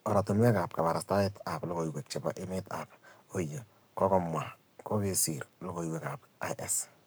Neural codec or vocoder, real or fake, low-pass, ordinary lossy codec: codec, 44.1 kHz, 7.8 kbps, Pupu-Codec; fake; none; none